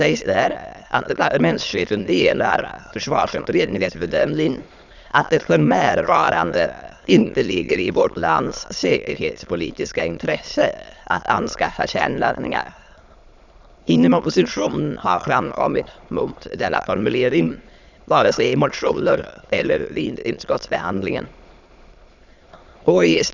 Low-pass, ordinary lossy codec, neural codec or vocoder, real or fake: 7.2 kHz; none; autoencoder, 22.05 kHz, a latent of 192 numbers a frame, VITS, trained on many speakers; fake